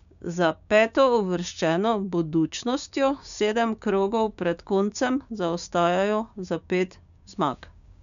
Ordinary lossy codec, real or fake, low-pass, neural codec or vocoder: MP3, 96 kbps; fake; 7.2 kHz; codec, 16 kHz, 6 kbps, DAC